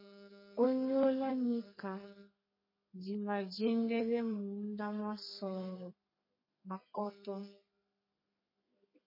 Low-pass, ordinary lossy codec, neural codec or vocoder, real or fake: 5.4 kHz; MP3, 24 kbps; codec, 32 kHz, 1.9 kbps, SNAC; fake